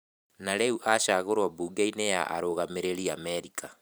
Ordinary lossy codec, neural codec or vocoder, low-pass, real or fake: none; vocoder, 44.1 kHz, 128 mel bands every 512 samples, BigVGAN v2; none; fake